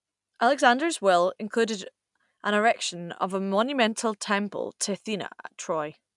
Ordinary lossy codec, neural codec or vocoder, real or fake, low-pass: MP3, 96 kbps; none; real; 10.8 kHz